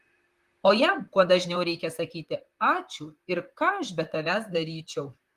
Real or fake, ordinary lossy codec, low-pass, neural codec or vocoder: fake; Opus, 24 kbps; 14.4 kHz; vocoder, 44.1 kHz, 128 mel bands, Pupu-Vocoder